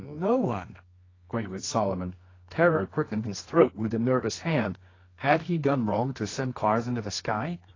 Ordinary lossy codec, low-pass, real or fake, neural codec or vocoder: AAC, 32 kbps; 7.2 kHz; fake; codec, 24 kHz, 0.9 kbps, WavTokenizer, medium music audio release